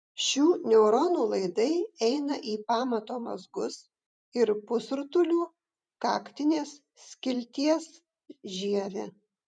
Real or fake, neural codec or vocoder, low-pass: fake; vocoder, 44.1 kHz, 128 mel bands every 512 samples, BigVGAN v2; 9.9 kHz